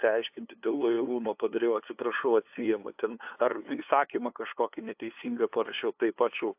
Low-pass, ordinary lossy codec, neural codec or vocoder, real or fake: 3.6 kHz; AAC, 32 kbps; codec, 16 kHz, 2 kbps, FunCodec, trained on LibriTTS, 25 frames a second; fake